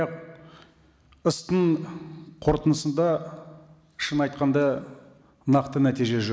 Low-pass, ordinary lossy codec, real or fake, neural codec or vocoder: none; none; real; none